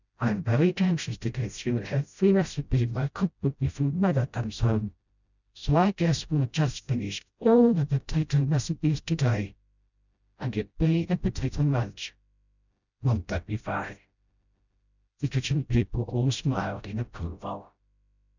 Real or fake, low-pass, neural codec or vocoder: fake; 7.2 kHz; codec, 16 kHz, 0.5 kbps, FreqCodec, smaller model